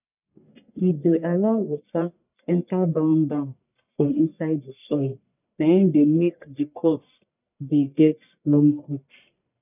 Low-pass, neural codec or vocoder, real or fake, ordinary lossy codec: 3.6 kHz; codec, 44.1 kHz, 1.7 kbps, Pupu-Codec; fake; none